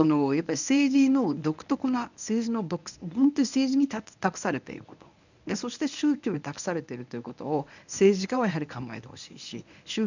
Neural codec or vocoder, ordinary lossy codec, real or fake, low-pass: codec, 24 kHz, 0.9 kbps, WavTokenizer, medium speech release version 1; none; fake; 7.2 kHz